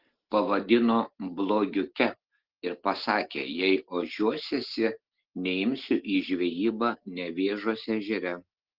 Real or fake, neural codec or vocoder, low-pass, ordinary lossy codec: real; none; 5.4 kHz; Opus, 16 kbps